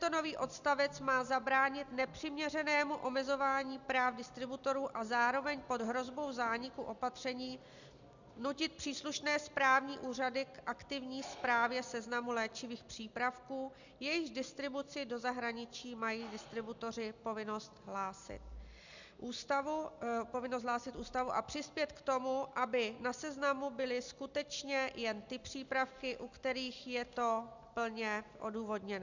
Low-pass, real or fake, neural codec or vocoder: 7.2 kHz; real; none